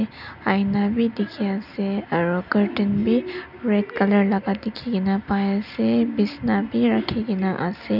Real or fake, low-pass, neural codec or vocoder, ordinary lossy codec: real; 5.4 kHz; none; none